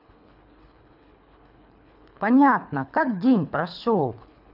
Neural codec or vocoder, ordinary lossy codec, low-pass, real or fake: codec, 24 kHz, 3 kbps, HILCodec; none; 5.4 kHz; fake